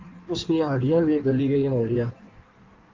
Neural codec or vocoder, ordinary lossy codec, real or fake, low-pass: codec, 16 kHz in and 24 kHz out, 1.1 kbps, FireRedTTS-2 codec; Opus, 32 kbps; fake; 7.2 kHz